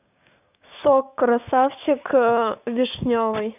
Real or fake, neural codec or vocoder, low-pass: fake; codec, 16 kHz, 6 kbps, DAC; 3.6 kHz